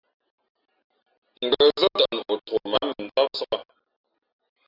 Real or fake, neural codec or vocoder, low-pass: real; none; 5.4 kHz